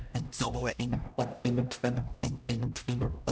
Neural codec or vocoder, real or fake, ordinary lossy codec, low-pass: codec, 16 kHz, 1 kbps, X-Codec, HuBERT features, trained on LibriSpeech; fake; none; none